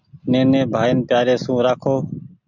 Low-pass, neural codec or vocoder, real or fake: 7.2 kHz; none; real